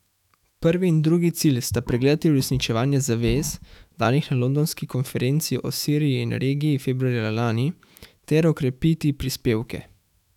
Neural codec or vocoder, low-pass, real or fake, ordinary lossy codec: autoencoder, 48 kHz, 128 numbers a frame, DAC-VAE, trained on Japanese speech; 19.8 kHz; fake; none